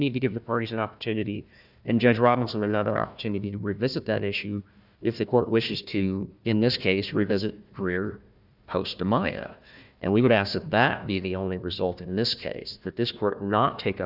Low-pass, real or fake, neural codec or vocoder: 5.4 kHz; fake; codec, 16 kHz, 1 kbps, FunCodec, trained on Chinese and English, 50 frames a second